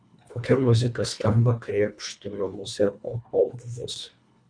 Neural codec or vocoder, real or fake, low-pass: codec, 24 kHz, 1.5 kbps, HILCodec; fake; 9.9 kHz